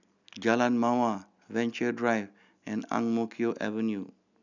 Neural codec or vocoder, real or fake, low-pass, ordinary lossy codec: none; real; 7.2 kHz; none